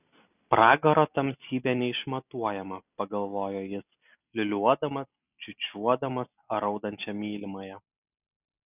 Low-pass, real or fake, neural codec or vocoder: 3.6 kHz; real; none